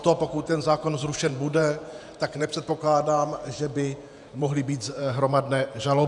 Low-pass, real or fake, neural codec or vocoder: 10.8 kHz; fake; vocoder, 48 kHz, 128 mel bands, Vocos